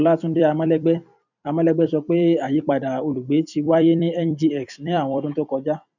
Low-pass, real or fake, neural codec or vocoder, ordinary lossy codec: 7.2 kHz; fake; vocoder, 44.1 kHz, 128 mel bands every 256 samples, BigVGAN v2; none